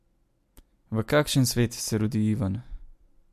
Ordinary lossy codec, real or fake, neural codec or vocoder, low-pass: MP3, 64 kbps; real; none; 14.4 kHz